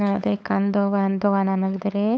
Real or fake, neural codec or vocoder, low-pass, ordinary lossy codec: fake; codec, 16 kHz, 8 kbps, FunCodec, trained on LibriTTS, 25 frames a second; none; none